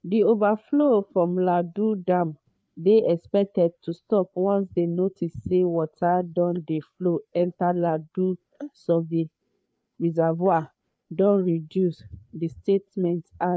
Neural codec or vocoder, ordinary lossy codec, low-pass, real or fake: codec, 16 kHz, 4 kbps, FreqCodec, larger model; none; none; fake